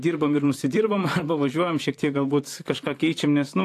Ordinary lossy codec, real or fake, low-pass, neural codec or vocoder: AAC, 64 kbps; fake; 14.4 kHz; vocoder, 44.1 kHz, 128 mel bands, Pupu-Vocoder